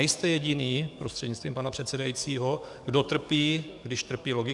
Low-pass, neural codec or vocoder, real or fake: 10.8 kHz; codec, 44.1 kHz, 7.8 kbps, DAC; fake